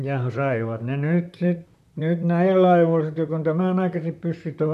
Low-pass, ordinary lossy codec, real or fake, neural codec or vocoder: 14.4 kHz; none; fake; vocoder, 44.1 kHz, 128 mel bands, Pupu-Vocoder